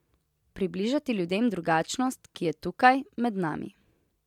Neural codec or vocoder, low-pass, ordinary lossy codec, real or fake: none; 19.8 kHz; MP3, 96 kbps; real